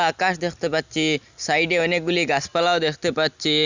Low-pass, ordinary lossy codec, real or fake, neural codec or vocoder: 7.2 kHz; Opus, 64 kbps; real; none